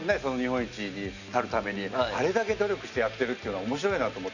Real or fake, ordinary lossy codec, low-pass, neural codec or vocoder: real; none; 7.2 kHz; none